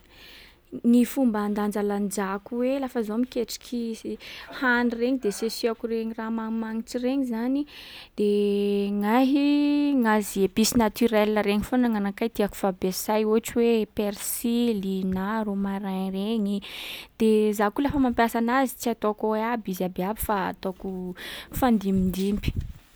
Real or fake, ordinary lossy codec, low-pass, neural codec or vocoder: real; none; none; none